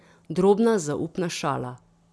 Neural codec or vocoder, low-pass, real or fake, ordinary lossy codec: none; none; real; none